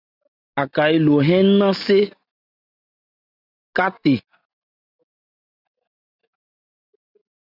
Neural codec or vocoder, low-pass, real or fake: none; 5.4 kHz; real